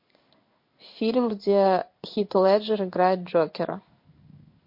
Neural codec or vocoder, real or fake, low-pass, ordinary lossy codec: codec, 16 kHz in and 24 kHz out, 1 kbps, XY-Tokenizer; fake; 5.4 kHz; MP3, 32 kbps